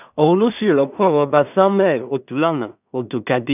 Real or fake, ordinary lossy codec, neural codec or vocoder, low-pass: fake; none; codec, 16 kHz in and 24 kHz out, 0.4 kbps, LongCat-Audio-Codec, two codebook decoder; 3.6 kHz